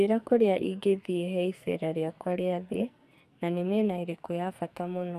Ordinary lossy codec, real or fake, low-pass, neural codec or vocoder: none; fake; 14.4 kHz; codec, 44.1 kHz, 2.6 kbps, SNAC